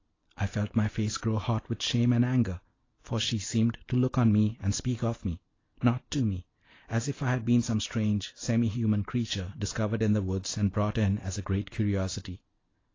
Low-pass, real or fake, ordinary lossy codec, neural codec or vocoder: 7.2 kHz; real; AAC, 32 kbps; none